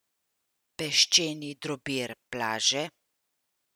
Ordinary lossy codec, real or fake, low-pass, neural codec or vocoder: none; real; none; none